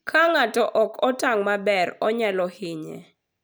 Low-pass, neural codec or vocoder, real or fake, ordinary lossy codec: none; none; real; none